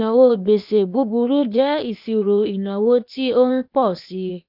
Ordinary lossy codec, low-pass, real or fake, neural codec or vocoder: none; 5.4 kHz; fake; codec, 16 kHz, 0.8 kbps, ZipCodec